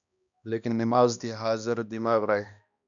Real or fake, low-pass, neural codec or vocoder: fake; 7.2 kHz; codec, 16 kHz, 1 kbps, X-Codec, HuBERT features, trained on balanced general audio